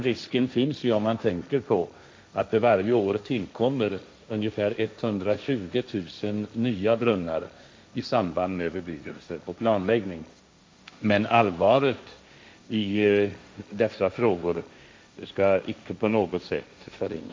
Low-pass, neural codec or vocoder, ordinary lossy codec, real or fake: none; codec, 16 kHz, 1.1 kbps, Voila-Tokenizer; none; fake